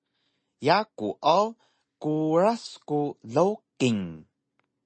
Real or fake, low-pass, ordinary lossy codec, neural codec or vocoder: real; 9.9 kHz; MP3, 32 kbps; none